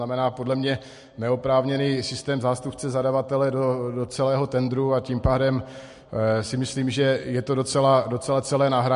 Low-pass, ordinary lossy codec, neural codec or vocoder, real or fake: 10.8 kHz; MP3, 48 kbps; none; real